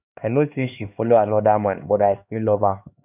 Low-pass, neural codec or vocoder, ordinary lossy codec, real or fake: 3.6 kHz; codec, 16 kHz, 2 kbps, X-Codec, HuBERT features, trained on LibriSpeech; none; fake